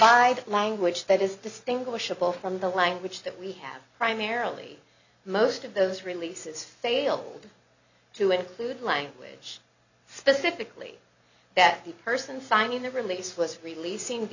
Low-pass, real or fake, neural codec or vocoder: 7.2 kHz; real; none